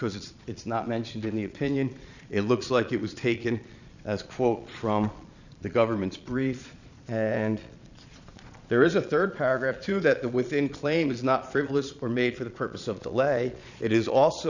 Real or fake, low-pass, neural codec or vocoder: fake; 7.2 kHz; vocoder, 22.05 kHz, 80 mel bands, Vocos